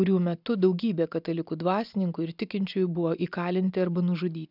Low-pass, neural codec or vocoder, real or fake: 5.4 kHz; none; real